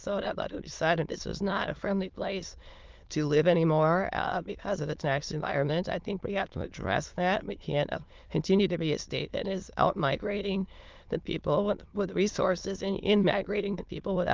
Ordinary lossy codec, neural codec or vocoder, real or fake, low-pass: Opus, 32 kbps; autoencoder, 22.05 kHz, a latent of 192 numbers a frame, VITS, trained on many speakers; fake; 7.2 kHz